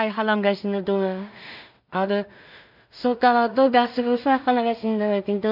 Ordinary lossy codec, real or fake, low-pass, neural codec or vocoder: none; fake; 5.4 kHz; codec, 16 kHz in and 24 kHz out, 0.4 kbps, LongCat-Audio-Codec, two codebook decoder